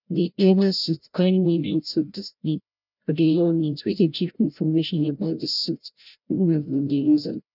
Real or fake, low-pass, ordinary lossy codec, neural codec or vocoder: fake; 5.4 kHz; none; codec, 16 kHz, 0.5 kbps, FreqCodec, larger model